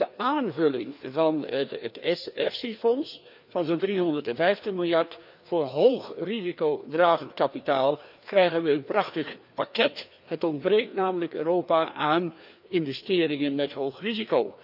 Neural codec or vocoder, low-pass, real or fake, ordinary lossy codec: codec, 16 kHz, 2 kbps, FreqCodec, larger model; 5.4 kHz; fake; none